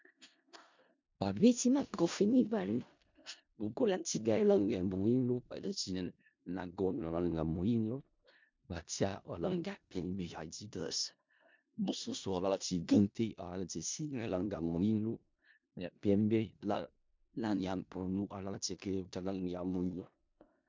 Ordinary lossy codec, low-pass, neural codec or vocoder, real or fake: MP3, 64 kbps; 7.2 kHz; codec, 16 kHz in and 24 kHz out, 0.4 kbps, LongCat-Audio-Codec, four codebook decoder; fake